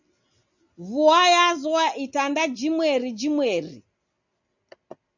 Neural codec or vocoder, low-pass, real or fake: none; 7.2 kHz; real